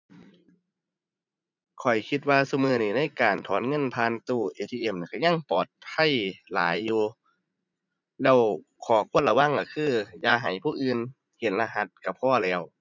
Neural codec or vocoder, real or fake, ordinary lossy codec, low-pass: vocoder, 44.1 kHz, 80 mel bands, Vocos; fake; none; 7.2 kHz